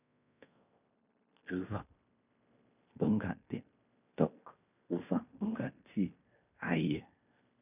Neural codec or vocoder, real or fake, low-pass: codec, 16 kHz in and 24 kHz out, 0.9 kbps, LongCat-Audio-Codec, fine tuned four codebook decoder; fake; 3.6 kHz